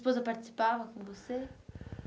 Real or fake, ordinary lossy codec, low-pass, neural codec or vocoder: real; none; none; none